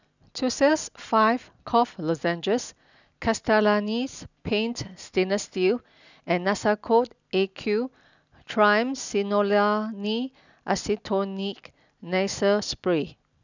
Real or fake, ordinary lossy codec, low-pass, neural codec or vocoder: real; none; 7.2 kHz; none